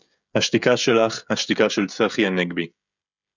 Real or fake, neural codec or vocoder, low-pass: fake; codec, 16 kHz, 16 kbps, FreqCodec, smaller model; 7.2 kHz